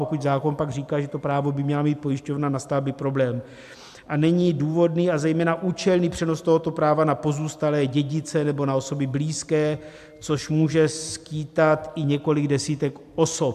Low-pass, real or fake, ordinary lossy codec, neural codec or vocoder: 14.4 kHz; real; AAC, 96 kbps; none